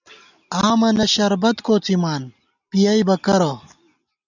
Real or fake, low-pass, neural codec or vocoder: real; 7.2 kHz; none